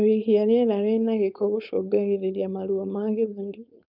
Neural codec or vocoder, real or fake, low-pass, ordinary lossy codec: codec, 16 kHz, 4.8 kbps, FACodec; fake; 5.4 kHz; none